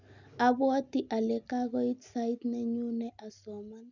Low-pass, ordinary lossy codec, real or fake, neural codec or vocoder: 7.2 kHz; none; real; none